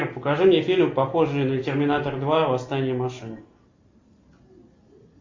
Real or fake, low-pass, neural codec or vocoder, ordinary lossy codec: real; 7.2 kHz; none; MP3, 48 kbps